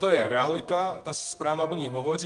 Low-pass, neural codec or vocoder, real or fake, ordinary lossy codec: 10.8 kHz; codec, 24 kHz, 0.9 kbps, WavTokenizer, medium music audio release; fake; Opus, 32 kbps